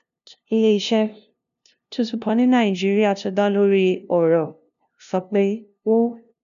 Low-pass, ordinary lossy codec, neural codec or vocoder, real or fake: 7.2 kHz; none; codec, 16 kHz, 0.5 kbps, FunCodec, trained on LibriTTS, 25 frames a second; fake